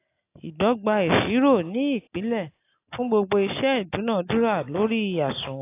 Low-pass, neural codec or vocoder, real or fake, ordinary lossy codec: 3.6 kHz; none; real; AAC, 24 kbps